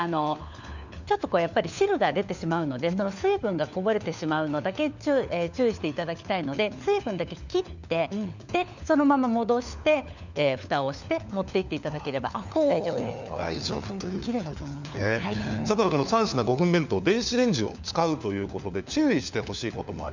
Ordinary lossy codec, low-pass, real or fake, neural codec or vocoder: none; 7.2 kHz; fake; codec, 16 kHz, 4 kbps, FunCodec, trained on LibriTTS, 50 frames a second